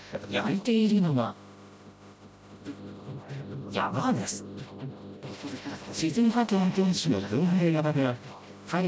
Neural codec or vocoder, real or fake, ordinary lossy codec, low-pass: codec, 16 kHz, 0.5 kbps, FreqCodec, smaller model; fake; none; none